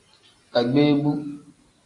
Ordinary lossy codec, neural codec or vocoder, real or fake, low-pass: AAC, 48 kbps; none; real; 10.8 kHz